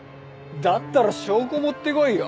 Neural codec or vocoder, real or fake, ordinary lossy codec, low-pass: none; real; none; none